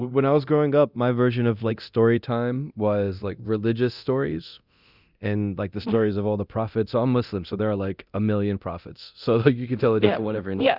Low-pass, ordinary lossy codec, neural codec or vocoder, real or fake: 5.4 kHz; Opus, 64 kbps; codec, 24 kHz, 0.9 kbps, DualCodec; fake